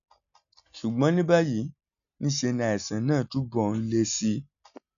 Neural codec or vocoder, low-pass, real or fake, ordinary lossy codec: none; 7.2 kHz; real; none